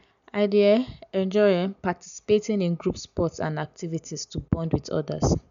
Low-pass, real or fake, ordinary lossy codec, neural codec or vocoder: 7.2 kHz; real; none; none